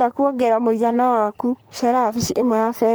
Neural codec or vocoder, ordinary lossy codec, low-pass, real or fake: codec, 44.1 kHz, 3.4 kbps, Pupu-Codec; none; none; fake